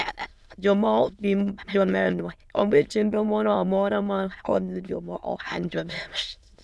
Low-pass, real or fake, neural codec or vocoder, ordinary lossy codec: none; fake; autoencoder, 22.05 kHz, a latent of 192 numbers a frame, VITS, trained on many speakers; none